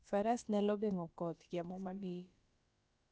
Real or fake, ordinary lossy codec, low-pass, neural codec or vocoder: fake; none; none; codec, 16 kHz, about 1 kbps, DyCAST, with the encoder's durations